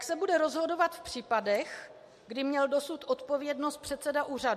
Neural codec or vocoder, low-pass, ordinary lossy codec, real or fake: none; 14.4 kHz; MP3, 64 kbps; real